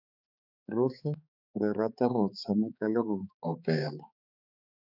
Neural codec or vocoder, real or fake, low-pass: codec, 16 kHz, 4 kbps, X-Codec, HuBERT features, trained on balanced general audio; fake; 5.4 kHz